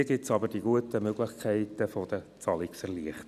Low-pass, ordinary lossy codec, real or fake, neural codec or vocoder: 14.4 kHz; none; real; none